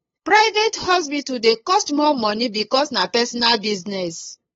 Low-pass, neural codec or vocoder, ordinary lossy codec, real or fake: 7.2 kHz; codec, 16 kHz, 8 kbps, FunCodec, trained on LibriTTS, 25 frames a second; AAC, 24 kbps; fake